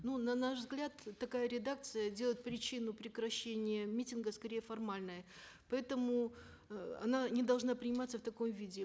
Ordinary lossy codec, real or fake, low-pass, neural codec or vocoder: none; real; none; none